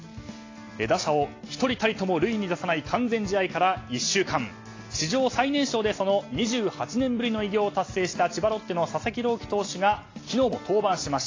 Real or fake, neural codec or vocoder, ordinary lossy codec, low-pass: real; none; AAC, 32 kbps; 7.2 kHz